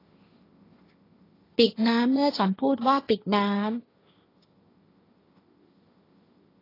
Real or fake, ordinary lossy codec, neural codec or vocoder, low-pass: fake; AAC, 24 kbps; codec, 16 kHz, 1.1 kbps, Voila-Tokenizer; 5.4 kHz